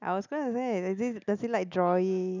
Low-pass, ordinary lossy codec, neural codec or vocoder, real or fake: 7.2 kHz; none; none; real